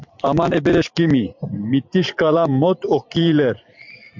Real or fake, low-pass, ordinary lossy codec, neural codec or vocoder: fake; 7.2 kHz; MP3, 64 kbps; vocoder, 44.1 kHz, 128 mel bands every 512 samples, BigVGAN v2